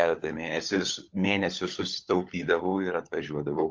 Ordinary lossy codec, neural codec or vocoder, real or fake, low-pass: Opus, 32 kbps; codec, 16 kHz, 16 kbps, FunCodec, trained on LibriTTS, 50 frames a second; fake; 7.2 kHz